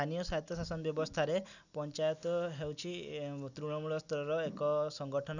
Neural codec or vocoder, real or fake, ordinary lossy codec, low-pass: none; real; none; 7.2 kHz